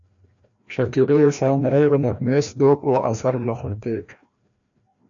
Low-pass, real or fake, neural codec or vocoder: 7.2 kHz; fake; codec, 16 kHz, 1 kbps, FreqCodec, larger model